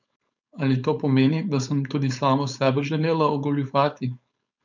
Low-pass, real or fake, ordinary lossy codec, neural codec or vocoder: 7.2 kHz; fake; none; codec, 16 kHz, 4.8 kbps, FACodec